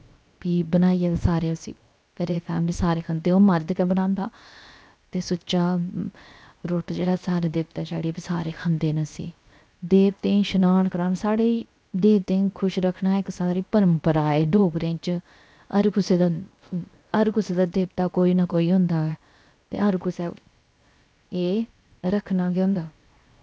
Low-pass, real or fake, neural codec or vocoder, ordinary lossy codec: none; fake; codec, 16 kHz, 0.7 kbps, FocalCodec; none